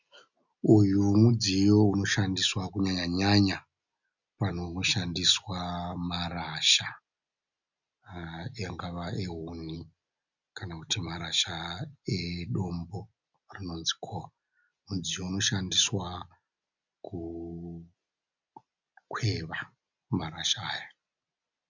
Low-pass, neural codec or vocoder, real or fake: 7.2 kHz; none; real